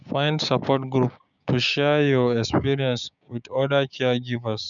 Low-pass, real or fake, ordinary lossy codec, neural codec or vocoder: 7.2 kHz; fake; none; codec, 16 kHz, 6 kbps, DAC